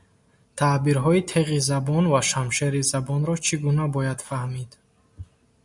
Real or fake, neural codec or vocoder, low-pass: real; none; 10.8 kHz